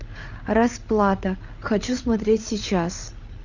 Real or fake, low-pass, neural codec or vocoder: fake; 7.2 kHz; codec, 16 kHz, 8 kbps, FreqCodec, larger model